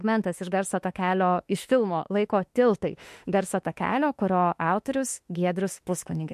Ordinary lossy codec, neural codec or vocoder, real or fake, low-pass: MP3, 64 kbps; autoencoder, 48 kHz, 32 numbers a frame, DAC-VAE, trained on Japanese speech; fake; 14.4 kHz